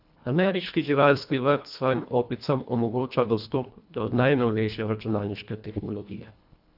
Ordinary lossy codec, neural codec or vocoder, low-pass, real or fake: none; codec, 24 kHz, 1.5 kbps, HILCodec; 5.4 kHz; fake